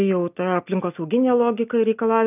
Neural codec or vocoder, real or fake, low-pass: none; real; 3.6 kHz